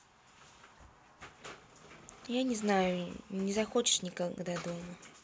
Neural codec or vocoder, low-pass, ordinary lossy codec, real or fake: none; none; none; real